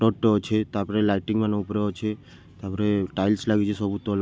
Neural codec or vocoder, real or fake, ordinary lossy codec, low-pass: none; real; none; none